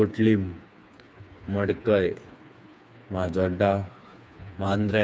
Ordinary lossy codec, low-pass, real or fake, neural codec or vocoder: none; none; fake; codec, 16 kHz, 4 kbps, FreqCodec, smaller model